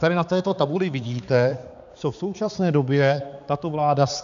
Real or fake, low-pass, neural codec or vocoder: fake; 7.2 kHz; codec, 16 kHz, 4 kbps, X-Codec, HuBERT features, trained on balanced general audio